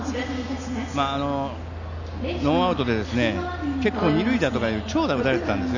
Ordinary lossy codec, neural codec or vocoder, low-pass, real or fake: none; none; 7.2 kHz; real